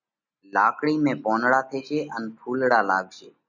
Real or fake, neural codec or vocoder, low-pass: real; none; 7.2 kHz